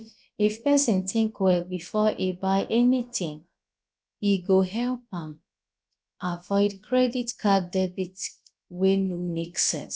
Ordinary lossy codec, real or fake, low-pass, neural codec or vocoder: none; fake; none; codec, 16 kHz, about 1 kbps, DyCAST, with the encoder's durations